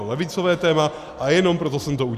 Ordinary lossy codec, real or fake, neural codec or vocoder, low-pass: Opus, 64 kbps; real; none; 14.4 kHz